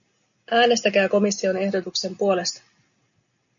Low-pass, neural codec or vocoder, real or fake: 7.2 kHz; none; real